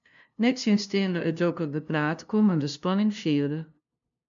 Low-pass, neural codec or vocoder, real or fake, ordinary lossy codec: 7.2 kHz; codec, 16 kHz, 0.5 kbps, FunCodec, trained on LibriTTS, 25 frames a second; fake; MP3, 64 kbps